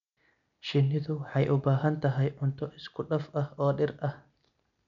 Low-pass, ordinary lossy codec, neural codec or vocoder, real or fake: 7.2 kHz; none; none; real